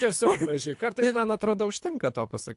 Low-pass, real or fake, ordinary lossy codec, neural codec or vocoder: 10.8 kHz; fake; AAC, 64 kbps; codec, 24 kHz, 3 kbps, HILCodec